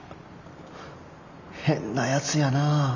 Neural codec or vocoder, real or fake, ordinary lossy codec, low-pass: none; real; none; 7.2 kHz